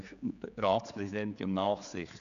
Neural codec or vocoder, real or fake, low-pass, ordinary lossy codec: codec, 16 kHz, 4 kbps, X-Codec, HuBERT features, trained on general audio; fake; 7.2 kHz; none